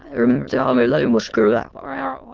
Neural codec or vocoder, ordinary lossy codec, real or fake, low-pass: autoencoder, 22.05 kHz, a latent of 192 numbers a frame, VITS, trained on many speakers; Opus, 16 kbps; fake; 7.2 kHz